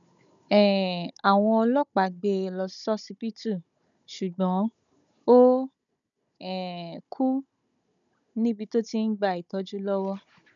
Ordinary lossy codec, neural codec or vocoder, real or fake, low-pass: none; codec, 16 kHz, 16 kbps, FunCodec, trained on Chinese and English, 50 frames a second; fake; 7.2 kHz